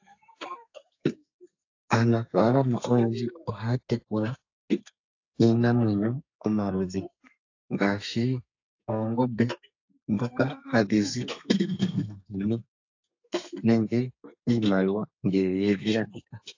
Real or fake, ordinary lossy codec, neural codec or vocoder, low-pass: fake; AAC, 48 kbps; codec, 32 kHz, 1.9 kbps, SNAC; 7.2 kHz